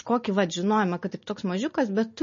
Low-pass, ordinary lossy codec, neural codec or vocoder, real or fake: 7.2 kHz; MP3, 32 kbps; none; real